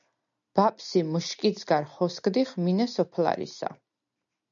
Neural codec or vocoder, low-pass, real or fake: none; 7.2 kHz; real